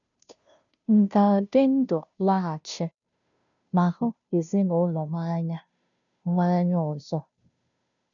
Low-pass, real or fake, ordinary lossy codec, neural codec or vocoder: 7.2 kHz; fake; none; codec, 16 kHz, 0.5 kbps, FunCodec, trained on Chinese and English, 25 frames a second